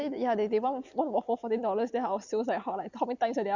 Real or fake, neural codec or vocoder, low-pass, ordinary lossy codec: real; none; 7.2 kHz; Opus, 64 kbps